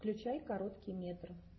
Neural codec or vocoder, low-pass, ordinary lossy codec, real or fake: none; 7.2 kHz; MP3, 24 kbps; real